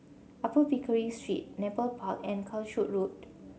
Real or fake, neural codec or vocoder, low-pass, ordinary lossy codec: real; none; none; none